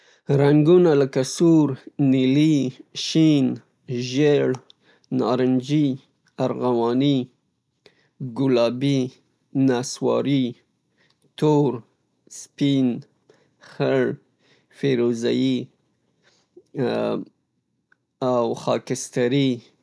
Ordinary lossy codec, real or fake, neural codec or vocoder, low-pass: none; real; none; none